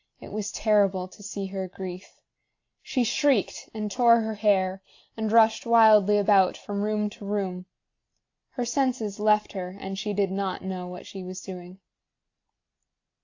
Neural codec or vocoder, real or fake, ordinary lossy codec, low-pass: none; real; AAC, 48 kbps; 7.2 kHz